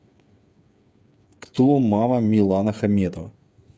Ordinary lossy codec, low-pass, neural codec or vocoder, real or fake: none; none; codec, 16 kHz, 8 kbps, FreqCodec, smaller model; fake